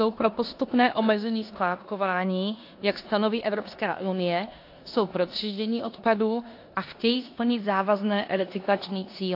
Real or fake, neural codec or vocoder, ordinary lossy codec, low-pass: fake; codec, 16 kHz in and 24 kHz out, 0.9 kbps, LongCat-Audio-Codec, four codebook decoder; AAC, 32 kbps; 5.4 kHz